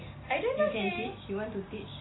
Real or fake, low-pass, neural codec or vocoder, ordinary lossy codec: real; 7.2 kHz; none; AAC, 16 kbps